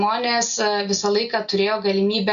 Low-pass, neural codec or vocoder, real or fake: 7.2 kHz; none; real